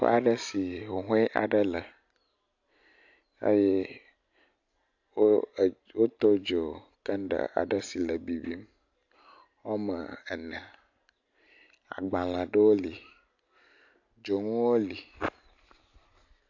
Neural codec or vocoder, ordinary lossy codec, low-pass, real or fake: none; AAC, 48 kbps; 7.2 kHz; real